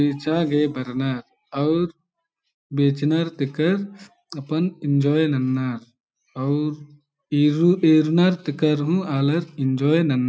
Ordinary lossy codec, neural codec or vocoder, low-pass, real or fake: none; none; none; real